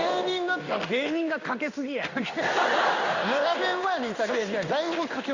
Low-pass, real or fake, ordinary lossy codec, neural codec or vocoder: 7.2 kHz; fake; Opus, 64 kbps; codec, 16 kHz in and 24 kHz out, 1 kbps, XY-Tokenizer